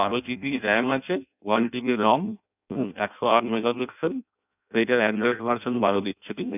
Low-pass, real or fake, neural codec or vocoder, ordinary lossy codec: 3.6 kHz; fake; codec, 16 kHz in and 24 kHz out, 0.6 kbps, FireRedTTS-2 codec; none